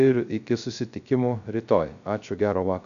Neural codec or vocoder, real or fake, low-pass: codec, 16 kHz, 0.3 kbps, FocalCodec; fake; 7.2 kHz